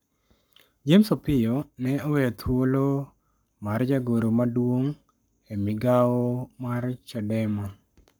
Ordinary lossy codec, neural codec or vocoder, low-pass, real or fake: none; codec, 44.1 kHz, 7.8 kbps, Pupu-Codec; none; fake